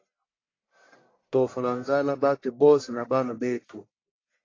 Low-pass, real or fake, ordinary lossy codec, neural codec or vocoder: 7.2 kHz; fake; AAC, 32 kbps; codec, 44.1 kHz, 1.7 kbps, Pupu-Codec